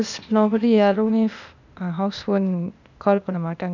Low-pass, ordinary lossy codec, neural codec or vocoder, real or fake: 7.2 kHz; none; codec, 16 kHz, 0.8 kbps, ZipCodec; fake